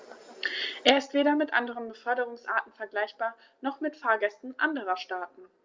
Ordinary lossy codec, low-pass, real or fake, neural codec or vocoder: Opus, 32 kbps; 7.2 kHz; real; none